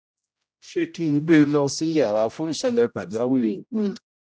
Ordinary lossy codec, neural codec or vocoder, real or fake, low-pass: none; codec, 16 kHz, 0.5 kbps, X-Codec, HuBERT features, trained on general audio; fake; none